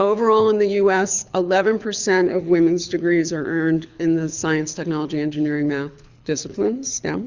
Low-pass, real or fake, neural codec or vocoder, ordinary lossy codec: 7.2 kHz; fake; codec, 24 kHz, 6 kbps, HILCodec; Opus, 64 kbps